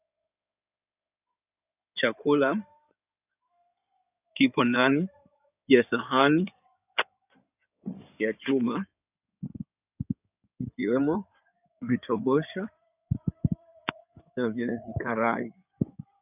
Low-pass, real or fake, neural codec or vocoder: 3.6 kHz; fake; codec, 16 kHz in and 24 kHz out, 2.2 kbps, FireRedTTS-2 codec